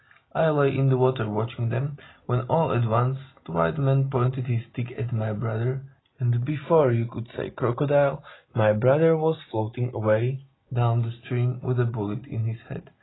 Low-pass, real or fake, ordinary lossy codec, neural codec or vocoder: 7.2 kHz; real; AAC, 16 kbps; none